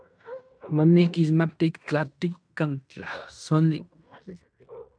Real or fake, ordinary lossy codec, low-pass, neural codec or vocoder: fake; MP3, 96 kbps; 9.9 kHz; codec, 16 kHz in and 24 kHz out, 0.9 kbps, LongCat-Audio-Codec, fine tuned four codebook decoder